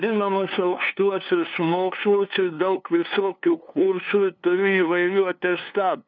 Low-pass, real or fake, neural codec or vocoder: 7.2 kHz; fake; codec, 16 kHz, 2 kbps, FunCodec, trained on LibriTTS, 25 frames a second